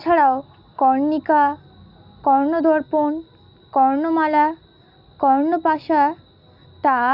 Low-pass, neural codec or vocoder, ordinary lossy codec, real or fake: 5.4 kHz; none; Opus, 64 kbps; real